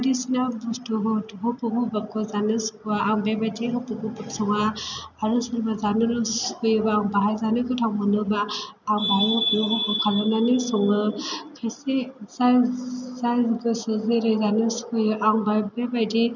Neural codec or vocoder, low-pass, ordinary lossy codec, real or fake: none; 7.2 kHz; none; real